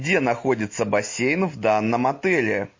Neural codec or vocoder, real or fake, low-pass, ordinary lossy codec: none; real; 7.2 kHz; MP3, 32 kbps